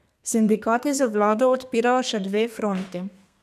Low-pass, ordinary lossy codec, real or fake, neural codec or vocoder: 14.4 kHz; none; fake; codec, 32 kHz, 1.9 kbps, SNAC